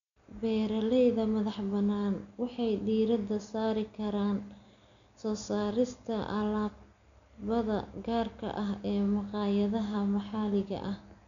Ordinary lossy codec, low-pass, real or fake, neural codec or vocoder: none; 7.2 kHz; real; none